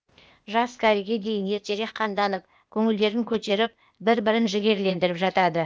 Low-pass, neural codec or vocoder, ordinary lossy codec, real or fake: none; codec, 16 kHz, 0.8 kbps, ZipCodec; none; fake